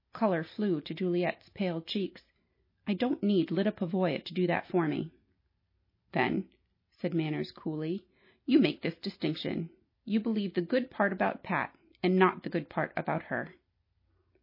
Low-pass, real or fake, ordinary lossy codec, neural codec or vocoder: 5.4 kHz; real; MP3, 24 kbps; none